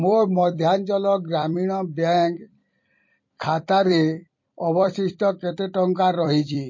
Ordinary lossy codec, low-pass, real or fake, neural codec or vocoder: MP3, 32 kbps; 7.2 kHz; fake; vocoder, 44.1 kHz, 128 mel bands every 256 samples, BigVGAN v2